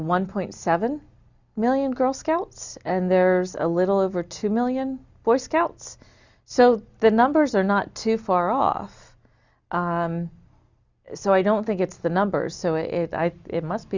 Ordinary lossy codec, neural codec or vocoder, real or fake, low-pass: Opus, 64 kbps; none; real; 7.2 kHz